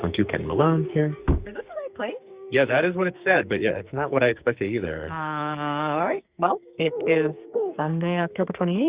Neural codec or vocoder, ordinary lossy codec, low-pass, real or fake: codec, 44.1 kHz, 2.6 kbps, SNAC; Opus, 64 kbps; 3.6 kHz; fake